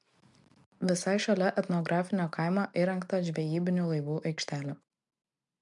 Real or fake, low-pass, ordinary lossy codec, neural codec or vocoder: real; 10.8 kHz; MP3, 64 kbps; none